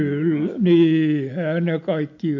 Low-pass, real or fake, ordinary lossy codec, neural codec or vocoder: 7.2 kHz; real; MP3, 48 kbps; none